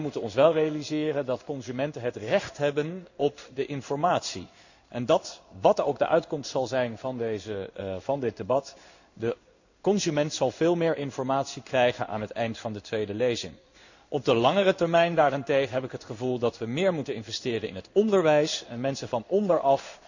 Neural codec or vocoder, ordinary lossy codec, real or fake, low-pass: codec, 16 kHz in and 24 kHz out, 1 kbps, XY-Tokenizer; none; fake; 7.2 kHz